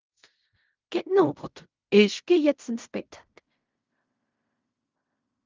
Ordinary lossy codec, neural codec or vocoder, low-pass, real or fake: Opus, 32 kbps; codec, 16 kHz in and 24 kHz out, 0.4 kbps, LongCat-Audio-Codec, fine tuned four codebook decoder; 7.2 kHz; fake